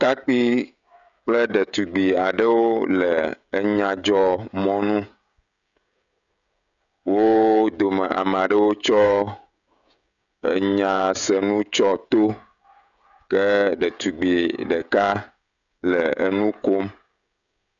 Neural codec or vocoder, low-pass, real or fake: codec, 16 kHz, 16 kbps, FreqCodec, smaller model; 7.2 kHz; fake